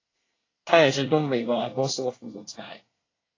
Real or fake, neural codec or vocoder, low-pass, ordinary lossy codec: fake; codec, 24 kHz, 1 kbps, SNAC; 7.2 kHz; AAC, 32 kbps